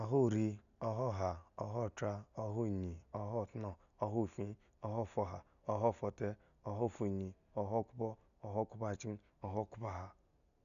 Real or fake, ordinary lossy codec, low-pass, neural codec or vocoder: real; none; 7.2 kHz; none